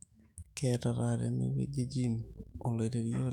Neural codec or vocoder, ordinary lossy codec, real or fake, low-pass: autoencoder, 48 kHz, 128 numbers a frame, DAC-VAE, trained on Japanese speech; none; fake; 19.8 kHz